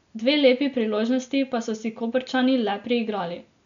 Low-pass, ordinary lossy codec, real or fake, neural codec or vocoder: 7.2 kHz; none; real; none